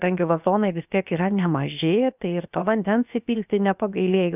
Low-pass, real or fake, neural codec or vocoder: 3.6 kHz; fake; codec, 16 kHz, 0.7 kbps, FocalCodec